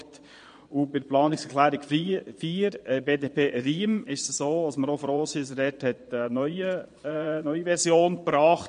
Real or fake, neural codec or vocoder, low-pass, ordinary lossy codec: fake; vocoder, 22.05 kHz, 80 mel bands, Vocos; 9.9 kHz; MP3, 48 kbps